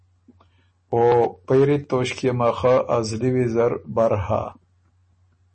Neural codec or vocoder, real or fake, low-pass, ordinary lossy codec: none; real; 10.8 kHz; MP3, 32 kbps